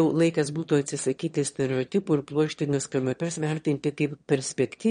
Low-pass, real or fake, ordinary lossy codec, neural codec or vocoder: 9.9 kHz; fake; MP3, 48 kbps; autoencoder, 22.05 kHz, a latent of 192 numbers a frame, VITS, trained on one speaker